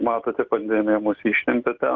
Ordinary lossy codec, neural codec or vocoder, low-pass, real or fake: Opus, 24 kbps; none; 7.2 kHz; real